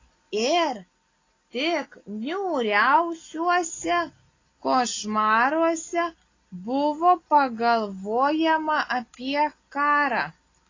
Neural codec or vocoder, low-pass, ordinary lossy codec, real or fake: none; 7.2 kHz; AAC, 32 kbps; real